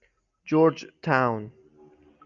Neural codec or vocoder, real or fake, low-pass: none; real; 7.2 kHz